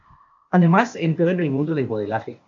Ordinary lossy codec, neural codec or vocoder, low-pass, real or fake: AAC, 64 kbps; codec, 16 kHz, 0.8 kbps, ZipCodec; 7.2 kHz; fake